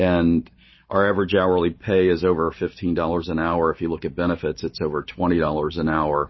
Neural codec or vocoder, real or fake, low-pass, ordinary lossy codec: none; real; 7.2 kHz; MP3, 24 kbps